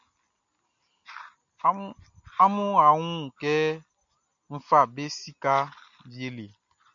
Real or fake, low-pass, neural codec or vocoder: real; 7.2 kHz; none